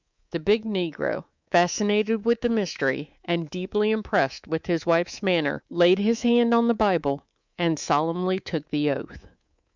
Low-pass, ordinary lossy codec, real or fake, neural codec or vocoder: 7.2 kHz; Opus, 64 kbps; fake; codec, 24 kHz, 3.1 kbps, DualCodec